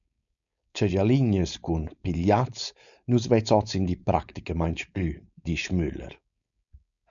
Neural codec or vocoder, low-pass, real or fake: codec, 16 kHz, 4.8 kbps, FACodec; 7.2 kHz; fake